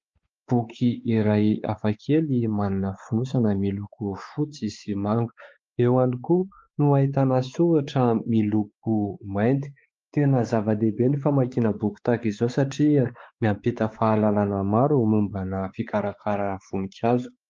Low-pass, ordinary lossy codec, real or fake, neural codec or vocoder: 7.2 kHz; Opus, 24 kbps; fake; codec, 16 kHz, 4 kbps, X-Codec, WavLM features, trained on Multilingual LibriSpeech